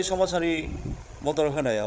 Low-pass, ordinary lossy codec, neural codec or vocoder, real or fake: none; none; codec, 16 kHz, 16 kbps, FreqCodec, larger model; fake